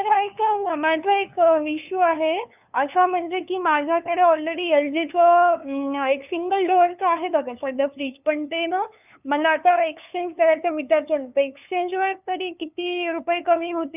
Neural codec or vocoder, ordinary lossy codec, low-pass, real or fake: codec, 16 kHz, 2 kbps, FunCodec, trained on LibriTTS, 25 frames a second; none; 3.6 kHz; fake